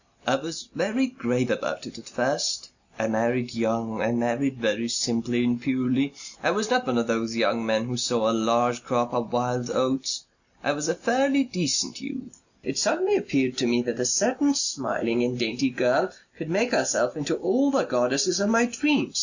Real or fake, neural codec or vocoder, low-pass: real; none; 7.2 kHz